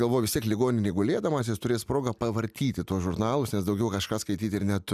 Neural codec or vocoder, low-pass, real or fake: none; 14.4 kHz; real